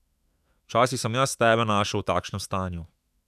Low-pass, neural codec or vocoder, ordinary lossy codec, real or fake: 14.4 kHz; autoencoder, 48 kHz, 128 numbers a frame, DAC-VAE, trained on Japanese speech; none; fake